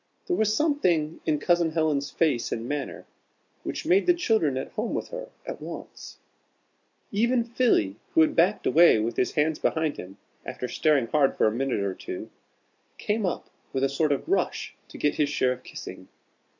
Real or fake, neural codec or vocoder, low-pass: real; none; 7.2 kHz